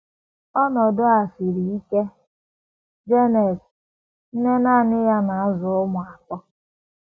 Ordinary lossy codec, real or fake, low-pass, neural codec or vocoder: none; real; none; none